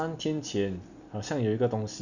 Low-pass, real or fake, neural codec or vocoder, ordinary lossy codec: 7.2 kHz; real; none; none